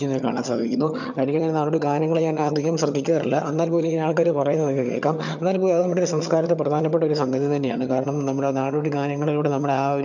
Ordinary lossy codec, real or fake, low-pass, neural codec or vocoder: none; fake; 7.2 kHz; vocoder, 22.05 kHz, 80 mel bands, HiFi-GAN